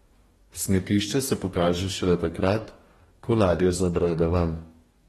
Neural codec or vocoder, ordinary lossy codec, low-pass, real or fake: codec, 44.1 kHz, 2.6 kbps, DAC; AAC, 32 kbps; 19.8 kHz; fake